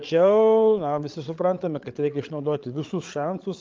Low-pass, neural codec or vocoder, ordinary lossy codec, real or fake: 7.2 kHz; codec, 16 kHz, 8 kbps, FreqCodec, larger model; Opus, 32 kbps; fake